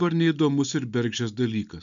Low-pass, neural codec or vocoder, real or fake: 7.2 kHz; none; real